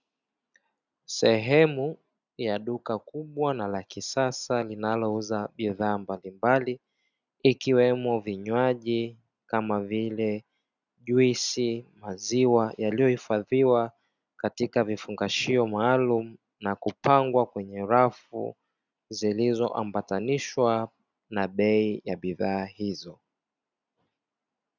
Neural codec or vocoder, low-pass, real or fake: none; 7.2 kHz; real